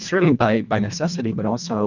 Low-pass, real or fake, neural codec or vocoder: 7.2 kHz; fake; codec, 24 kHz, 1.5 kbps, HILCodec